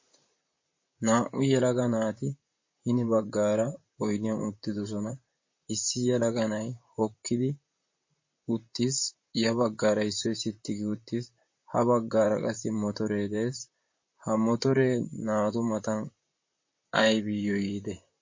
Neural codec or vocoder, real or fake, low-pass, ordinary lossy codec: vocoder, 44.1 kHz, 128 mel bands, Pupu-Vocoder; fake; 7.2 kHz; MP3, 32 kbps